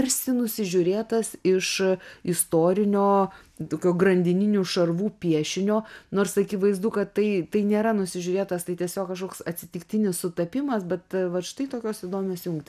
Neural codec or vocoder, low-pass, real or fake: none; 14.4 kHz; real